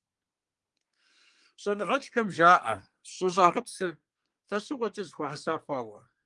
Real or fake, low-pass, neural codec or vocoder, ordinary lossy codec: fake; 10.8 kHz; codec, 24 kHz, 1 kbps, SNAC; Opus, 32 kbps